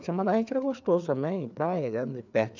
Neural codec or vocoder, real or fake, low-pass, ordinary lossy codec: codec, 16 kHz, 4 kbps, FreqCodec, larger model; fake; 7.2 kHz; none